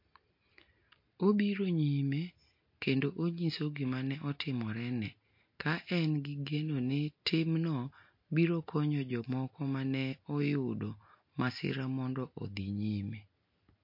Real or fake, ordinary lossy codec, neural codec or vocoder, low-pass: real; MP3, 32 kbps; none; 5.4 kHz